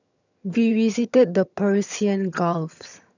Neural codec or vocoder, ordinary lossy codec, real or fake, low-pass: vocoder, 22.05 kHz, 80 mel bands, HiFi-GAN; none; fake; 7.2 kHz